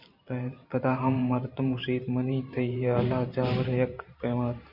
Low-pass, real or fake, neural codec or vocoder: 5.4 kHz; fake; vocoder, 44.1 kHz, 128 mel bands every 512 samples, BigVGAN v2